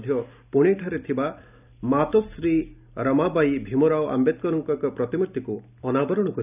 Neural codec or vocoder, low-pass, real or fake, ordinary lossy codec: none; 3.6 kHz; real; none